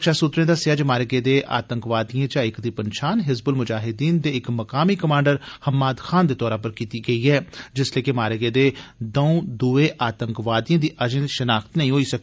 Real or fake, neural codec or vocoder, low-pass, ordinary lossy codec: real; none; none; none